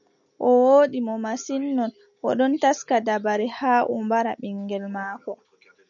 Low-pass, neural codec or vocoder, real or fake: 7.2 kHz; none; real